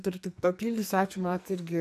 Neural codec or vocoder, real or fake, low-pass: codec, 44.1 kHz, 2.6 kbps, SNAC; fake; 14.4 kHz